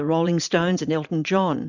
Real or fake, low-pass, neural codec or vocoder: fake; 7.2 kHz; vocoder, 44.1 kHz, 80 mel bands, Vocos